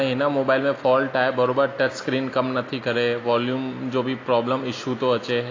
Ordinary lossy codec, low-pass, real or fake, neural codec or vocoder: AAC, 32 kbps; 7.2 kHz; real; none